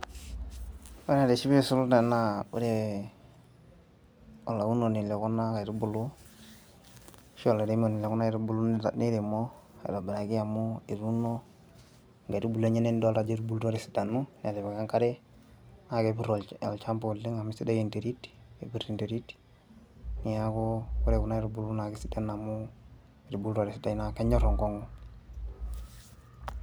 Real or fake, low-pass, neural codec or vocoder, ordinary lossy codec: real; none; none; none